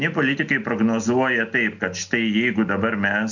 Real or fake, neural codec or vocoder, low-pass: real; none; 7.2 kHz